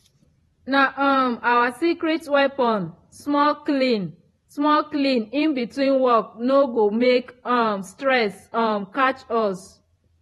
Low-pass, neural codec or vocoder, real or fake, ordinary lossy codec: 19.8 kHz; vocoder, 48 kHz, 128 mel bands, Vocos; fake; AAC, 32 kbps